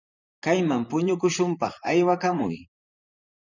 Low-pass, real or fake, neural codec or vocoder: 7.2 kHz; fake; vocoder, 44.1 kHz, 128 mel bands, Pupu-Vocoder